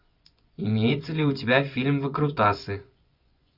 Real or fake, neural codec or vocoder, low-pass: fake; vocoder, 24 kHz, 100 mel bands, Vocos; 5.4 kHz